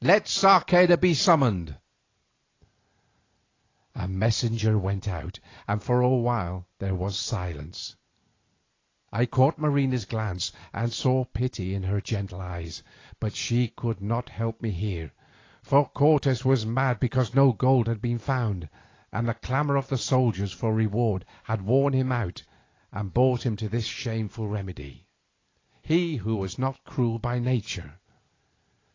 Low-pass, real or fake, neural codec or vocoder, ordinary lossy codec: 7.2 kHz; real; none; AAC, 32 kbps